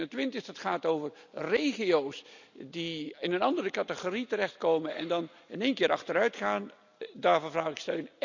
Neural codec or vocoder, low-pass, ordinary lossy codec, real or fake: none; 7.2 kHz; none; real